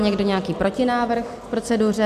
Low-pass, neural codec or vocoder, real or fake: 14.4 kHz; none; real